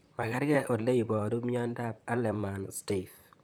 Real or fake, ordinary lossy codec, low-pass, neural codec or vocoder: fake; none; none; vocoder, 44.1 kHz, 128 mel bands, Pupu-Vocoder